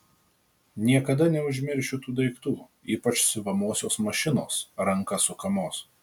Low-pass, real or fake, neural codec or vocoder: 19.8 kHz; real; none